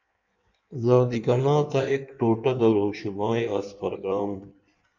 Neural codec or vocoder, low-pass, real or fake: codec, 16 kHz in and 24 kHz out, 1.1 kbps, FireRedTTS-2 codec; 7.2 kHz; fake